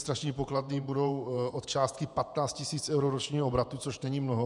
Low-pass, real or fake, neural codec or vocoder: 10.8 kHz; fake; vocoder, 48 kHz, 128 mel bands, Vocos